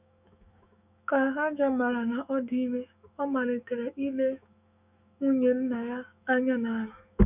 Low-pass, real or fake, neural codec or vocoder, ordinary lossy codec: 3.6 kHz; fake; autoencoder, 48 kHz, 128 numbers a frame, DAC-VAE, trained on Japanese speech; none